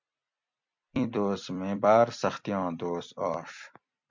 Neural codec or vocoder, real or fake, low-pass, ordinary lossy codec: vocoder, 44.1 kHz, 128 mel bands every 256 samples, BigVGAN v2; fake; 7.2 kHz; MP3, 64 kbps